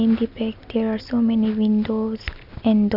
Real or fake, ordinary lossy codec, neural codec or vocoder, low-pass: real; none; none; 5.4 kHz